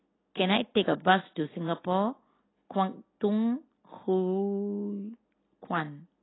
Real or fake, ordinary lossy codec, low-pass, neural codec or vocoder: real; AAC, 16 kbps; 7.2 kHz; none